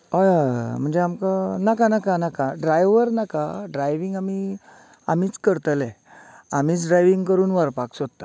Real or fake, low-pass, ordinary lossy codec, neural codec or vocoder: real; none; none; none